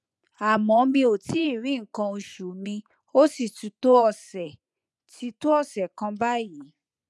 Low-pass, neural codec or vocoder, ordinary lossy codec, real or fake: none; vocoder, 24 kHz, 100 mel bands, Vocos; none; fake